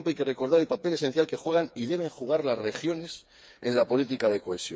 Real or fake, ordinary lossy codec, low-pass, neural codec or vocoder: fake; none; none; codec, 16 kHz, 4 kbps, FreqCodec, smaller model